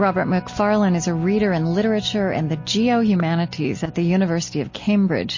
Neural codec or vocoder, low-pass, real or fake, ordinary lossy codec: none; 7.2 kHz; real; MP3, 32 kbps